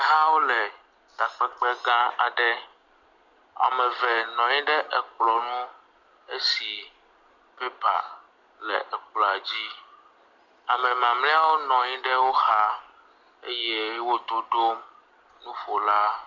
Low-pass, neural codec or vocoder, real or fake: 7.2 kHz; none; real